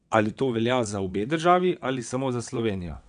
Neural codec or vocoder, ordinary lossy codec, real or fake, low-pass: vocoder, 22.05 kHz, 80 mel bands, WaveNeXt; none; fake; 9.9 kHz